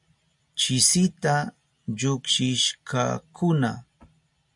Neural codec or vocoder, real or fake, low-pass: none; real; 10.8 kHz